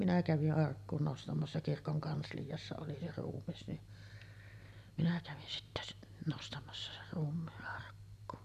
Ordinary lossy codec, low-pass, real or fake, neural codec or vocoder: none; 14.4 kHz; real; none